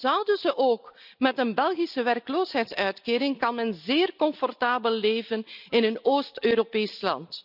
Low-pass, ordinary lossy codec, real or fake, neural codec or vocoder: 5.4 kHz; none; real; none